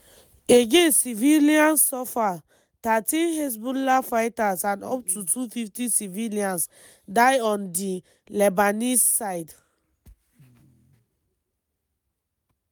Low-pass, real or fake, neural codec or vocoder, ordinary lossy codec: none; real; none; none